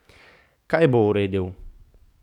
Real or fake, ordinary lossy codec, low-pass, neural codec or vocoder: fake; none; 19.8 kHz; codec, 44.1 kHz, 7.8 kbps, DAC